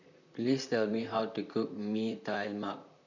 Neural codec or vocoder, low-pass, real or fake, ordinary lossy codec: vocoder, 44.1 kHz, 128 mel bands, Pupu-Vocoder; 7.2 kHz; fake; none